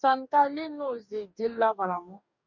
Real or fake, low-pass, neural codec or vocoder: fake; 7.2 kHz; codec, 44.1 kHz, 2.6 kbps, DAC